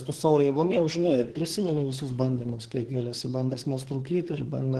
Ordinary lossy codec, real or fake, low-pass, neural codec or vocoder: Opus, 16 kbps; fake; 10.8 kHz; codec, 24 kHz, 1 kbps, SNAC